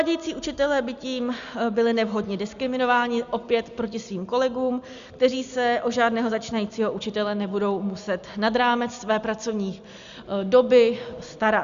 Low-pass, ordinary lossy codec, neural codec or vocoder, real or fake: 7.2 kHz; Opus, 64 kbps; none; real